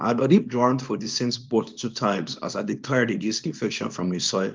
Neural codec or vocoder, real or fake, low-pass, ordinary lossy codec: codec, 24 kHz, 0.9 kbps, WavTokenizer, small release; fake; 7.2 kHz; Opus, 32 kbps